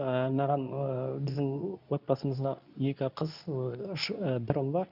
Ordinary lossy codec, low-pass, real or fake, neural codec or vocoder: none; 5.4 kHz; fake; codec, 24 kHz, 0.9 kbps, WavTokenizer, medium speech release version 2